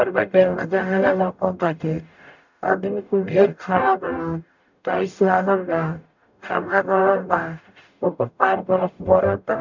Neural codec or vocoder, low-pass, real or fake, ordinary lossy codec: codec, 44.1 kHz, 0.9 kbps, DAC; 7.2 kHz; fake; none